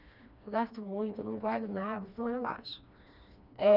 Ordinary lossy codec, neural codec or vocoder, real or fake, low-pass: none; codec, 16 kHz, 2 kbps, FreqCodec, smaller model; fake; 5.4 kHz